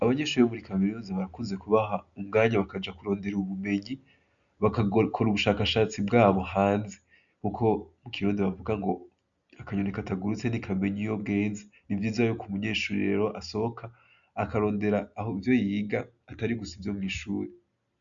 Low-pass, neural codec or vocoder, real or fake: 7.2 kHz; none; real